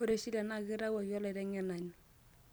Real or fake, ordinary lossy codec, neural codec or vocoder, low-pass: real; none; none; none